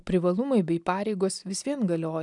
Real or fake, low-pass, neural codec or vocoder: real; 10.8 kHz; none